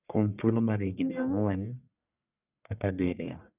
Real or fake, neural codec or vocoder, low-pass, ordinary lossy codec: fake; codec, 44.1 kHz, 1.7 kbps, Pupu-Codec; 3.6 kHz; none